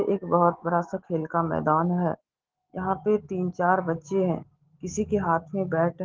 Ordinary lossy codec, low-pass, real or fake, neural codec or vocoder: Opus, 16 kbps; 7.2 kHz; fake; autoencoder, 48 kHz, 128 numbers a frame, DAC-VAE, trained on Japanese speech